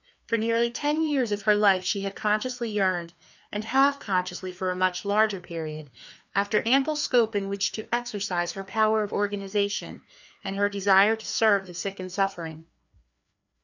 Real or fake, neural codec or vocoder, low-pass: fake; codec, 16 kHz, 2 kbps, FreqCodec, larger model; 7.2 kHz